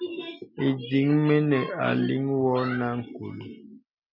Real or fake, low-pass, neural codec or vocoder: real; 5.4 kHz; none